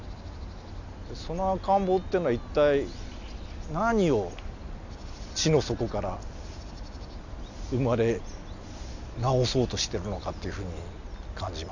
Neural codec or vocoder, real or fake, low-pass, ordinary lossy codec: none; real; 7.2 kHz; none